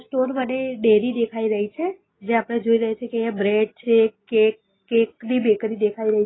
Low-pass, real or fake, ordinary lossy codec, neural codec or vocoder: 7.2 kHz; real; AAC, 16 kbps; none